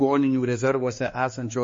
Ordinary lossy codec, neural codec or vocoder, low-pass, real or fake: MP3, 32 kbps; codec, 16 kHz, 2 kbps, X-Codec, HuBERT features, trained on balanced general audio; 7.2 kHz; fake